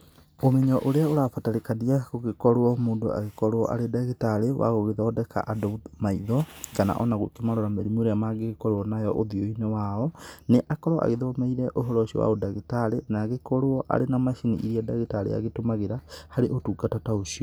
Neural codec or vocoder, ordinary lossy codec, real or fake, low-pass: none; none; real; none